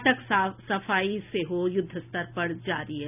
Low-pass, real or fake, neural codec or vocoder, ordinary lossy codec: 3.6 kHz; real; none; none